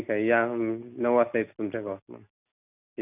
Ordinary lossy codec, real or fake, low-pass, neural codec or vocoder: none; real; 3.6 kHz; none